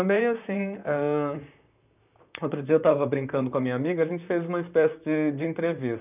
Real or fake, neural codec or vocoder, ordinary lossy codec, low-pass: fake; vocoder, 44.1 kHz, 128 mel bands, Pupu-Vocoder; none; 3.6 kHz